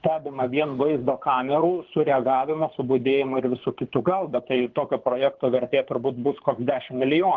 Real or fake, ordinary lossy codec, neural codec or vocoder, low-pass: fake; Opus, 16 kbps; codec, 24 kHz, 6 kbps, HILCodec; 7.2 kHz